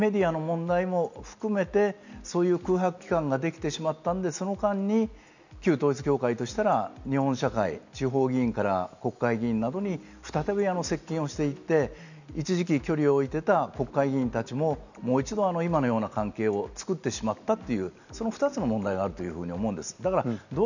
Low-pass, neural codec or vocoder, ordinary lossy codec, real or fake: 7.2 kHz; none; none; real